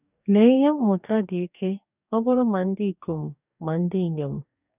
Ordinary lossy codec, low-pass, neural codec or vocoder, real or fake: none; 3.6 kHz; codec, 44.1 kHz, 2.6 kbps, DAC; fake